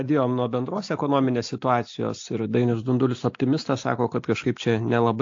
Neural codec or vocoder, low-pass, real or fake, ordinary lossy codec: none; 7.2 kHz; real; AAC, 48 kbps